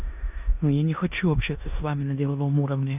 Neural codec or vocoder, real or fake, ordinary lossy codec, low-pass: codec, 16 kHz in and 24 kHz out, 0.9 kbps, LongCat-Audio-Codec, four codebook decoder; fake; none; 3.6 kHz